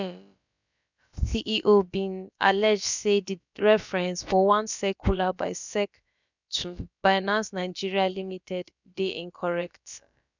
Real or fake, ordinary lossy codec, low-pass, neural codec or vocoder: fake; none; 7.2 kHz; codec, 16 kHz, about 1 kbps, DyCAST, with the encoder's durations